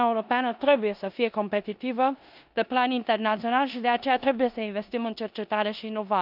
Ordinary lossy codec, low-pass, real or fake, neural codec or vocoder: none; 5.4 kHz; fake; codec, 16 kHz in and 24 kHz out, 0.9 kbps, LongCat-Audio-Codec, four codebook decoder